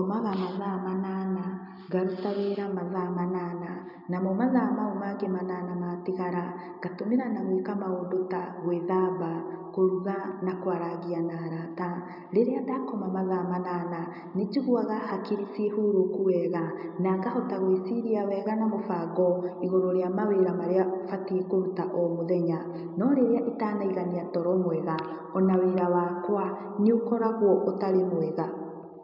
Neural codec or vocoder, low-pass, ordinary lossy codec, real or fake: none; 5.4 kHz; none; real